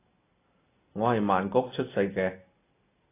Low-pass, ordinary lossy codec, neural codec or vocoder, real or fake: 3.6 kHz; AAC, 24 kbps; none; real